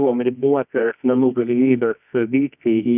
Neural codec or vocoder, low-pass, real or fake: codec, 24 kHz, 0.9 kbps, WavTokenizer, medium music audio release; 3.6 kHz; fake